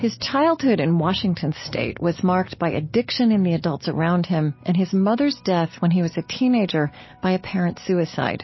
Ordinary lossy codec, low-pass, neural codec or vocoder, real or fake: MP3, 24 kbps; 7.2 kHz; none; real